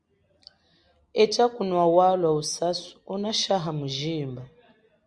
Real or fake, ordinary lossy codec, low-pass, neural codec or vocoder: real; Opus, 64 kbps; 9.9 kHz; none